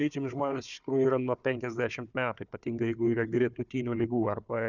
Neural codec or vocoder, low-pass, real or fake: codec, 16 kHz, 4 kbps, FunCodec, trained on Chinese and English, 50 frames a second; 7.2 kHz; fake